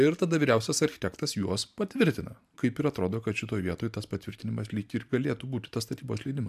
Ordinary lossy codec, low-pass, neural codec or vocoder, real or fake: AAC, 96 kbps; 14.4 kHz; vocoder, 44.1 kHz, 128 mel bands every 512 samples, BigVGAN v2; fake